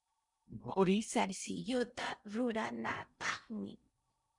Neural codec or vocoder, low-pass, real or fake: codec, 16 kHz in and 24 kHz out, 0.6 kbps, FocalCodec, streaming, 2048 codes; 10.8 kHz; fake